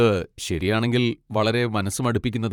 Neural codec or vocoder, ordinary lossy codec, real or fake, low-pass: vocoder, 44.1 kHz, 128 mel bands, Pupu-Vocoder; none; fake; 19.8 kHz